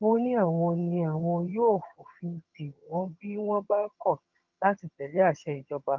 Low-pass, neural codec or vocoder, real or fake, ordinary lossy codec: 7.2 kHz; vocoder, 22.05 kHz, 80 mel bands, HiFi-GAN; fake; Opus, 32 kbps